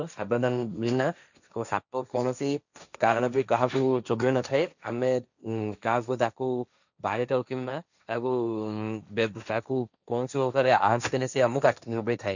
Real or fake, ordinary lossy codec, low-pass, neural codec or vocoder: fake; none; 7.2 kHz; codec, 16 kHz, 1.1 kbps, Voila-Tokenizer